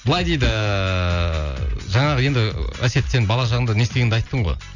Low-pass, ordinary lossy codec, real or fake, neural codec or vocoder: 7.2 kHz; none; real; none